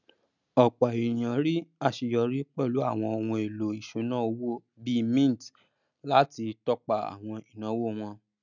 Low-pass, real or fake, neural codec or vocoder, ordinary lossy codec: 7.2 kHz; real; none; none